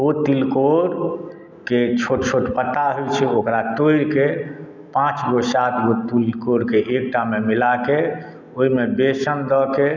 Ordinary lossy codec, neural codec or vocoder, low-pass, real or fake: none; none; 7.2 kHz; real